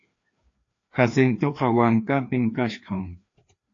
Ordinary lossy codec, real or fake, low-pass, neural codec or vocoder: AAC, 48 kbps; fake; 7.2 kHz; codec, 16 kHz, 2 kbps, FreqCodec, larger model